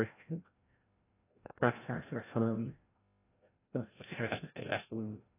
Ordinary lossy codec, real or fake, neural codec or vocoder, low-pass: AAC, 16 kbps; fake; codec, 16 kHz, 0.5 kbps, FreqCodec, larger model; 3.6 kHz